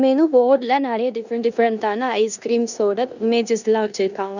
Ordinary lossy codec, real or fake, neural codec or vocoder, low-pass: none; fake; codec, 16 kHz in and 24 kHz out, 0.9 kbps, LongCat-Audio-Codec, four codebook decoder; 7.2 kHz